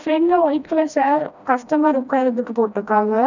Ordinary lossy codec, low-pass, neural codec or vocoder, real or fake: none; 7.2 kHz; codec, 16 kHz, 1 kbps, FreqCodec, smaller model; fake